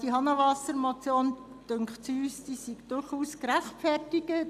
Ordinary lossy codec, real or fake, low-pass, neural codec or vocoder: none; real; 14.4 kHz; none